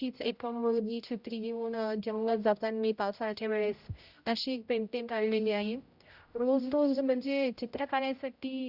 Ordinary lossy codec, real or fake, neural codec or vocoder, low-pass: Opus, 64 kbps; fake; codec, 16 kHz, 0.5 kbps, X-Codec, HuBERT features, trained on general audio; 5.4 kHz